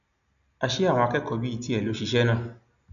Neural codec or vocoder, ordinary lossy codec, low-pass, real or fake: none; none; 7.2 kHz; real